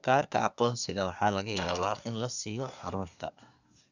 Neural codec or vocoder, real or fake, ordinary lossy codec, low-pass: codec, 24 kHz, 1 kbps, SNAC; fake; none; 7.2 kHz